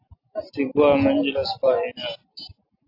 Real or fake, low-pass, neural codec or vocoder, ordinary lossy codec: real; 5.4 kHz; none; AAC, 32 kbps